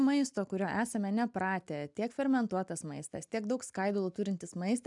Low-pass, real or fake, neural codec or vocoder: 10.8 kHz; real; none